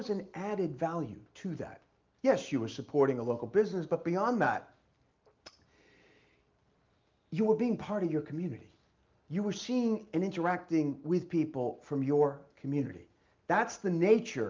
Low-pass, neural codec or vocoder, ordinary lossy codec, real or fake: 7.2 kHz; none; Opus, 24 kbps; real